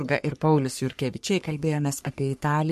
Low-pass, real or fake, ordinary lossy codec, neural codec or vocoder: 14.4 kHz; fake; MP3, 64 kbps; codec, 44.1 kHz, 3.4 kbps, Pupu-Codec